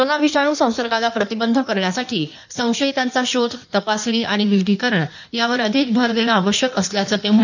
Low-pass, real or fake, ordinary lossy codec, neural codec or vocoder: 7.2 kHz; fake; none; codec, 16 kHz in and 24 kHz out, 1.1 kbps, FireRedTTS-2 codec